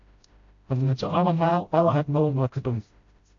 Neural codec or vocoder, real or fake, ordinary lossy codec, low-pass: codec, 16 kHz, 0.5 kbps, FreqCodec, smaller model; fake; AAC, 48 kbps; 7.2 kHz